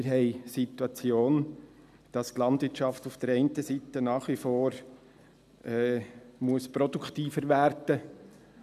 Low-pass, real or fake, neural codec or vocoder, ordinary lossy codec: 14.4 kHz; real; none; none